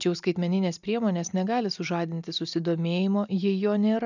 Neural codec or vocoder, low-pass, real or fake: none; 7.2 kHz; real